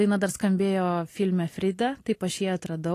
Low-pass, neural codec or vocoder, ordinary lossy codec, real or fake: 14.4 kHz; none; AAC, 48 kbps; real